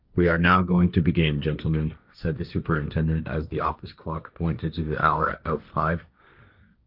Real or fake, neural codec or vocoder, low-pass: fake; codec, 16 kHz, 1.1 kbps, Voila-Tokenizer; 5.4 kHz